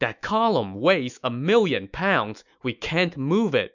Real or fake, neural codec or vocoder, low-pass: fake; autoencoder, 48 kHz, 128 numbers a frame, DAC-VAE, trained on Japanese speech; 7.2 kHz